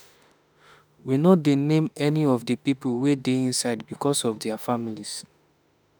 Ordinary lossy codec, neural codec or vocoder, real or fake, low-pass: none; autoencoder, 48 kHz, 32 numbers a frame, DAC-VAE, trained on Japanese speech; fake; none